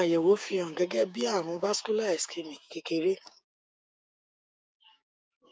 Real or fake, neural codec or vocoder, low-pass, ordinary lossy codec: fake; codec, 16 kHz, 6 kbps, DAC; none; none